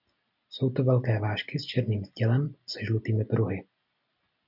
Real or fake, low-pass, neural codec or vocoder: real; 5.4 kHz; none